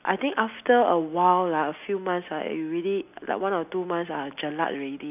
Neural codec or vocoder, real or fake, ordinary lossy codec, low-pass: none; real; none; 3.6 kHz